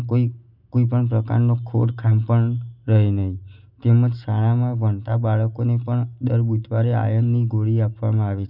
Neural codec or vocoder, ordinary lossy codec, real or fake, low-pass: none; none; real; 5.4 kHz